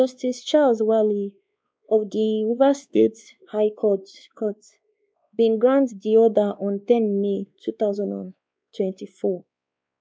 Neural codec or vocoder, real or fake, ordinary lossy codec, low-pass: codec, 16 kHz, 2 kbps, X-Codec, WavLM features, trained on Multilingual LibriSpeech; fake; none; none